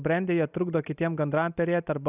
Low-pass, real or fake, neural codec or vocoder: 3.6 kHz; real; none